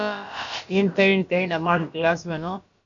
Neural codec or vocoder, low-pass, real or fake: codec, 16 kHz, about 1 kbps, DyCAST, with the encoder's durations; 7.2 kHz; fake